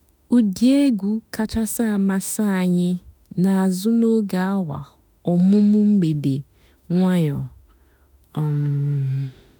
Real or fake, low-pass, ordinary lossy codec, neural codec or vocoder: fake; none; none; autoencoder, 48 kHz, 32 numbers a frame, DAC-VAE, trained on Japanese speech